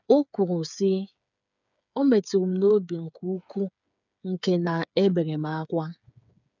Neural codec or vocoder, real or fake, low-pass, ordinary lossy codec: codec, 16 kHz, 8 kbps, FreqCodec, smaller model; fake; 7.2 kHz; none